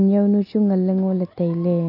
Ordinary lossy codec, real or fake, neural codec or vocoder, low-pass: none; real; none; 5.4 kHz